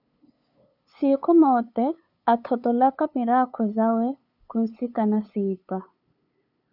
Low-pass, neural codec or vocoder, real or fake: 5.4 kHz; codec, 16 kHz, 8 kbps, FunCodec, trained on LibriTTS, 25 frames a second; fake